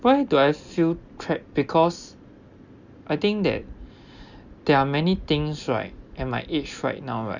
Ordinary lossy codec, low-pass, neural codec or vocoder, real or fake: Opus, 64 kbps; 7.2 kHz; none; real